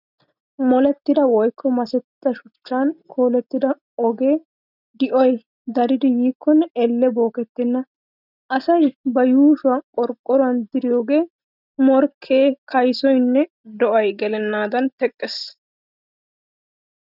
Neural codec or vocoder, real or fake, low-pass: none; real; 5.4 kHz